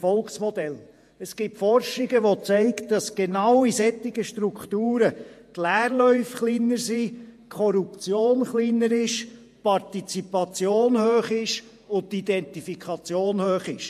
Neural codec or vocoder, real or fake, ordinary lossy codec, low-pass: vocoder, 48 kHz, 128 mel bands, Vocos; fake; MP3, 64 kbps; 14.4 kHz